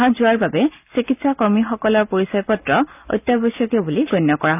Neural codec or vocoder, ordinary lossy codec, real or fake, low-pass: none; none; real; 3.6 kHz